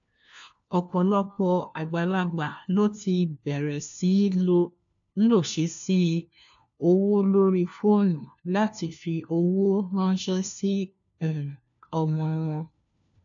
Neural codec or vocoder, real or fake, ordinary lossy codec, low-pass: codec, 16 kHz, 1 kbps, FunCodec, trained on LibriTTS, 50 frames a second; fake; AAC, 64 kbps; 7.2 kHz